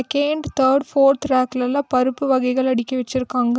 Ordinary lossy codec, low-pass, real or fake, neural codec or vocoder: none; none; real; none